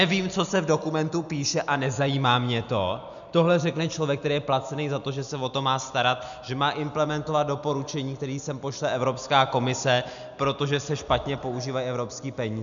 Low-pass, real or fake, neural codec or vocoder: 7.2 kHz; real; none